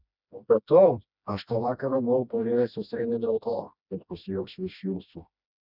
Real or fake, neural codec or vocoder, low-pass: fake; codec, 16 kHz, 1 kbps, FreqCodec, smaller model; 5.4 kHz